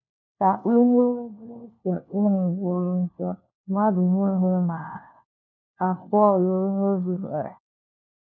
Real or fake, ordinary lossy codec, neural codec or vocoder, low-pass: fake; none; codec, 16 kHz, 1 kbps, FunCodec, trained on LibriTTS, 50 frames a second; 7.2 kHz